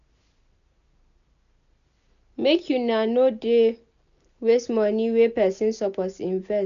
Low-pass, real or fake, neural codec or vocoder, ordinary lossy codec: 7.2 kHz; real; none; none